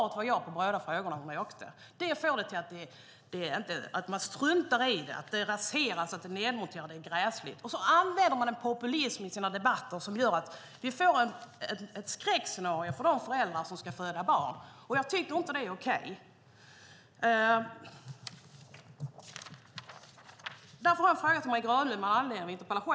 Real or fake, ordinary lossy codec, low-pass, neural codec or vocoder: real; none; none; none